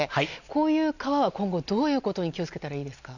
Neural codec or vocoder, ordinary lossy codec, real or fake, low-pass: none; none; real; 7.2 kHz